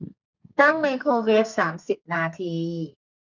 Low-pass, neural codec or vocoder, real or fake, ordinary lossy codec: 7.2 kHz; codec, 32 kHz, 1.9 kbps, SNAC; fake; none